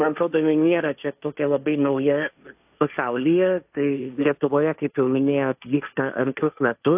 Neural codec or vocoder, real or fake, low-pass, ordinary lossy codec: codec, 16 kHz, 1.1 kbps, Voila-Tokenizer; fake; 3.6 kHz; AAC, 32 kbps